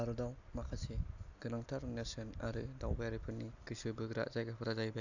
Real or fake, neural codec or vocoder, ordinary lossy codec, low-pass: real; none; none; 7.2 kHz